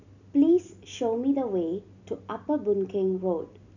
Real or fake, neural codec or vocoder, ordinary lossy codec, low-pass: real; none; none; 7.2 kHz